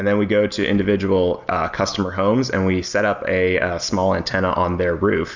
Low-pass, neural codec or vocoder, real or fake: 7.2 kHz; none; real